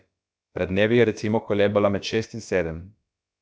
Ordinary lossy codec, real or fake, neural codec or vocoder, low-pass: none; fake; codec, 16 kHz, about 1 kbps, DyCAST, with the encoder's durations; none